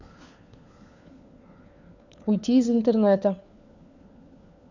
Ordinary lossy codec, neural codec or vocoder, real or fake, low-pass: none; codec, 16 kHz, 4 kbps, FunCodec, trained on LibriTTS, 50 frames a second; fake; 7.2 kHz